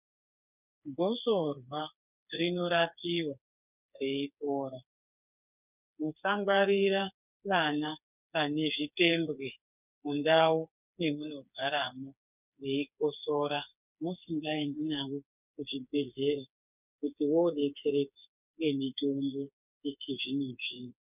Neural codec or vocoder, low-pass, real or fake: codec, 16 kHz, 4 kbps, FreqCodec, smaller model; 3.6 kHz; fake